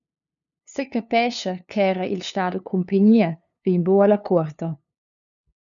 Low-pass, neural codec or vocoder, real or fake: 7.2 kHz; codec, 16 kHz, 2 kbps, FunCodec, trained on LibriTTS, 25 frames a second; fake